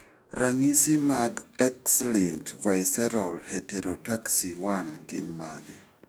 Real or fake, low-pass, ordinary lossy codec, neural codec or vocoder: fake; none; none; codec, 44.1 kHz, 2.6 kbps, DAC